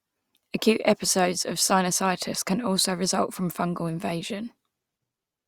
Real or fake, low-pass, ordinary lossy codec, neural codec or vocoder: real; 19.8 kHz; Opus, 64 kbps; none